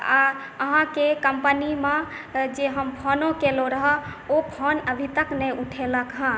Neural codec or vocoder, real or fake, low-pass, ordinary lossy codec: none; real; none; none